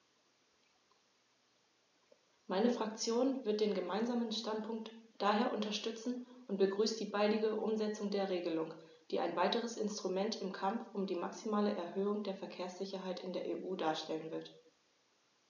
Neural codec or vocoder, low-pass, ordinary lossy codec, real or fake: none; 7.2 kHz; MP3, 64 kbps; real